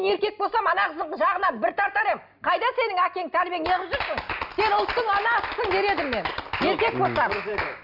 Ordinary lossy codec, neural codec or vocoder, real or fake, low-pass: none; none; real; 5.4 kHz